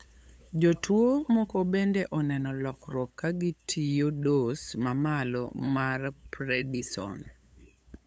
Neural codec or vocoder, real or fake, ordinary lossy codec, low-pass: codec, 16 kHz, 8 kbps, FunCodec, trained on LibriTTS, 25 frames a second; fake; none; none